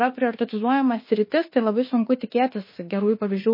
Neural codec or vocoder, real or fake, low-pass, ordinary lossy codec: autoencoder, 48 kHz, 32 numbers a frame, DAC-VAE, trained on Japanese speech; fake; 5.4 kHz; MP3, 24 kbps